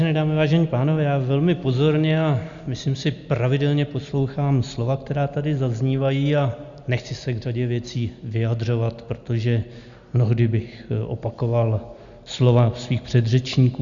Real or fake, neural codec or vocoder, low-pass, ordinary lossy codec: real; none; 7.2 kHz; Opus, 64 kbps